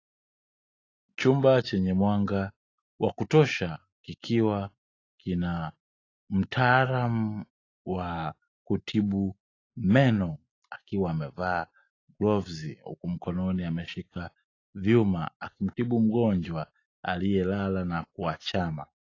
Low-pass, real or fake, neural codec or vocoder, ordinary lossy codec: 7.2 kHz; real; none; AAC, 32 kbps